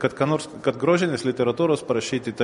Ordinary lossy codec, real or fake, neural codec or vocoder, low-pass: MP3, 48 kbps; real; none; 19.8 kHz